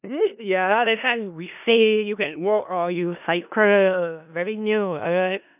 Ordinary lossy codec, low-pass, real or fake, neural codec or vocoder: none; 3.6 kHz; fake; codec, 16 kHz in and 24 kHz out, 0.4 kbps, LongCat-Audio-Codec, four codebook decoder